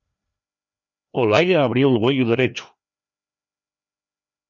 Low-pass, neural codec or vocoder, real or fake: 7.2 kHz; codec, 16 kHz, 2 kbps, FreqCodec, larger model; fake